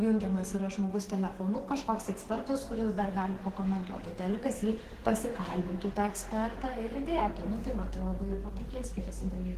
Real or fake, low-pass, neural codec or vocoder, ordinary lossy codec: fake; 14.4 kHz; codec, 32 kHz, 1.9 kbps, SNAC; Opus, 16 kbps